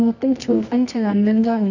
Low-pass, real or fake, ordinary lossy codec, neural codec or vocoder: 7.2 kHz; fake; none; codec, 24 kHz, 0.9 kbps, WavTokenizer, medium music audio release